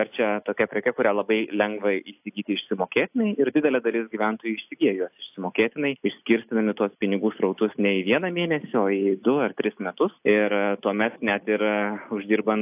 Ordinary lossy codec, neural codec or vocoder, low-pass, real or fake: AAC, 32 kbps; none; 3.6 kHz; real